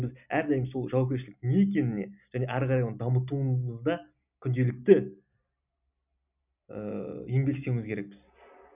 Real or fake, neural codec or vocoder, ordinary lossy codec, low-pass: real; none; none; 3.6 kHz